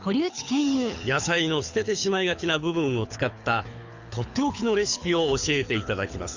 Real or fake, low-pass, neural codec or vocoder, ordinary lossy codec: fake; 7.2 kHz; codec, 24 kHz, 6 kbps, HILCodec; Opus, 64 kbps